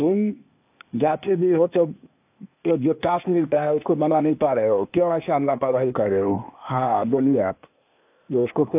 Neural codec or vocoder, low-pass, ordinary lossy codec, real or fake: codec, 16 kHz, 0.8 kbps, ZipCodec; 3.6 kHz; AAC, 32 kbps; fake